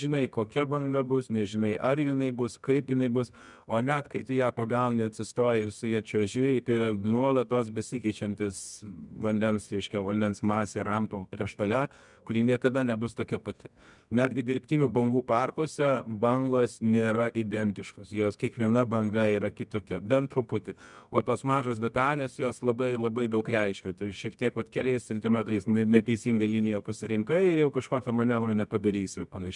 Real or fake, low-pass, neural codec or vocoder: fake; 10.8 kHz; codec, 24 kHz, 0.9 kbps, WavTokenizer, medium music audio release